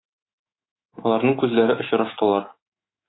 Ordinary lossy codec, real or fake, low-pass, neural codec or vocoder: AAC, 16 kbps; fake; 7.2 kHz; autoencoder, 48 kHz, 128 numbers a frame, DAC-VAE, trained on Japanese speech